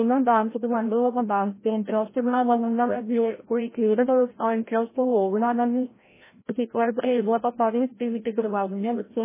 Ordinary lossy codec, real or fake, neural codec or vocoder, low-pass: MP3, 16 kbps; fake; codec, 16 kHz, 0.5 kbps, FreqCodec, larger model; 3.6 kHz